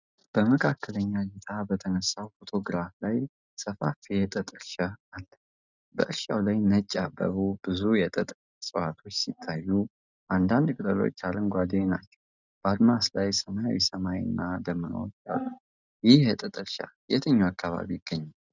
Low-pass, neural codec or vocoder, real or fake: 7.2 kHz; none; real